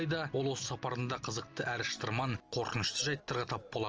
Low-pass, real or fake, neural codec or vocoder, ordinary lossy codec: 7.2 kHz; real; none; Opus, 24 kbps